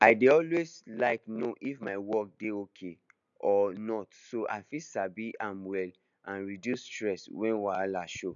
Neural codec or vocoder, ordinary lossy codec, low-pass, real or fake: none; none; 7.2 kHz; real